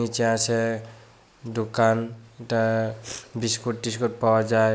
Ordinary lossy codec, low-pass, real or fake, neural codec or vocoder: none; none; real; none